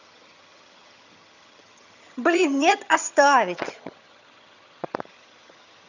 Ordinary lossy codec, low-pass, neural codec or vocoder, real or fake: none; 7.2 kHz; vocoder, 22.05 kHz, 80 mel bands, HiFi-GAN; fake